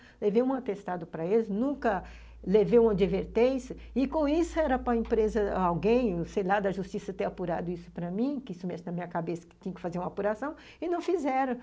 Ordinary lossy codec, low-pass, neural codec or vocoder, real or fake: none; none; none; real